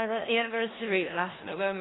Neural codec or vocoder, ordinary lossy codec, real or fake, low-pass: codec, 16 kHz in and 24 kHz out, 0.4 kbps, LongCat-Audio-Codec, four codebook decoder; AAC, 16 kbps; fake; 7.2 kHz